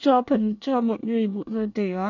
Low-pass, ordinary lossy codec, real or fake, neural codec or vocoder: 7.2 kHz; none; fake; codec, 24 kHz, 1 kbps, SNAC